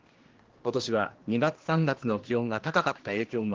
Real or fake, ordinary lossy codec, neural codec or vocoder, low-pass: fake; Opus, 16 kbps; codec, 16 kHz, 1 kbps, X-Codec, HuBERT features, trained on general audio; 7.2 kHz